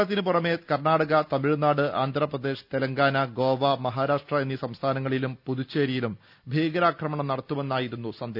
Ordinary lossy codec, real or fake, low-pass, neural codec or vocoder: none; real; 5.4 kHz; none